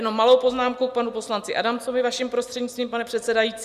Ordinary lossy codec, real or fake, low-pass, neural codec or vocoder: Opus, 64 kbps; real; 14.4 kHz; none